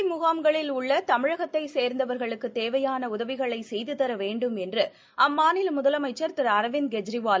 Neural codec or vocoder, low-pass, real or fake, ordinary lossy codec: none; none; real; none